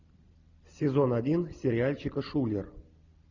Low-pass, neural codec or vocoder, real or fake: 7.2 kHz; none; real